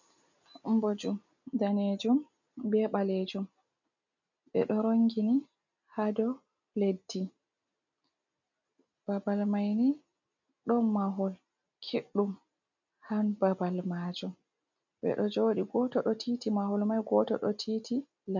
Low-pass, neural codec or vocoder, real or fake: 7.2 kHz; none; real